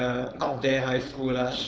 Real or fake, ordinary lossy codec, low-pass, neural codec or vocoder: fake; none; none; codec, 16 kHz, 4.8 kbps, FACodec